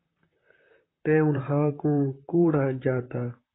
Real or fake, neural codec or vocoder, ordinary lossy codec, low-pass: fake; vocoder, 44.1 kHz, 128 mel bands, Pupu-Vocoder; AAC, 16 kbps; 7.2 kHz